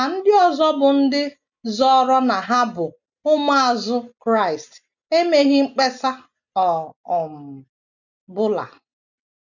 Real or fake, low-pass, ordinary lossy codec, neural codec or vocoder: real; 7.2 kHz; none; none